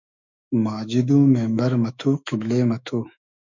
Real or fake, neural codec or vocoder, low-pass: fake; autoencoder, 48 kHz, 128 numbers a frame, DAC-VAE, trained on Japanese speech; 7.2 kHz